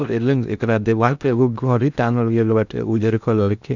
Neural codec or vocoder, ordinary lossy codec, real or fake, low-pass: codec, 16 kHz in and 24 kHz out, 0.6 kbps, FocalCodec, streaming, 2048 codes; none; fake; 7.2 kHz